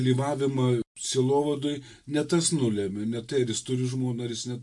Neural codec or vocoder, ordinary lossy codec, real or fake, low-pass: none; MP3, 64 kbps; real; 10.8 kHz